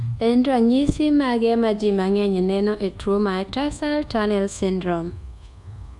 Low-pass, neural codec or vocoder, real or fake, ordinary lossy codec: 10.8 kHz; codec, 24 kHz, 1.2 kbps, DualCodec; fake; none